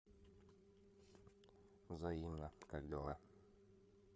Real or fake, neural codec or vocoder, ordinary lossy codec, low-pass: fake; codec, 16 kHz, 8 kbps, FreqCodec, larger model; none; none